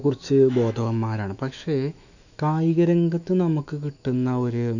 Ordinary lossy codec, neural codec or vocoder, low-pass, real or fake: none; autoencoder, 48 kHz, 128 numbers a frame, DAC-VAE, trained on Japanese speech; 7.2 kHz; fake